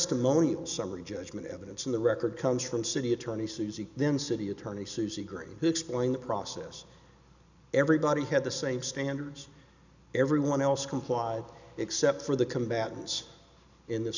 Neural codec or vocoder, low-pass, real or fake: none; 7.2 kHz; real